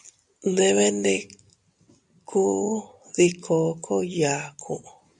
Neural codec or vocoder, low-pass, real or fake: none; 10.8 kHz; real